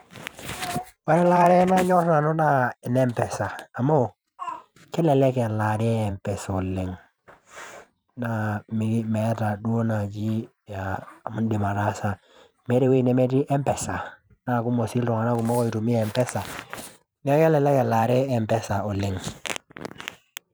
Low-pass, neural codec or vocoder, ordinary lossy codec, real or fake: none; vocoder, 44.1 kHz, 128 mel bands every 512 samples, BigVGAN v2; none; fake